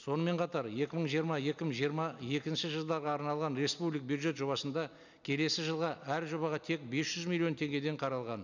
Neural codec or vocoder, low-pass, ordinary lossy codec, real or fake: none; 7.2 kHz; none; real